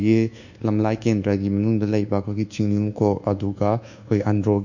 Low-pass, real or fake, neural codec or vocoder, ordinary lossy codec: 7.2 kHz; fake; codec, 24 kHz, 1.2 kbps, DualCodec; none